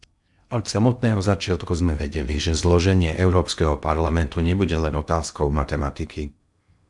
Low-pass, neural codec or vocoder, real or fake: 10.8 kHz; codec, 16 kHz in and 24 kHz out, 0.8 kbps, FocalCodec, streaming, 65536 codes; fake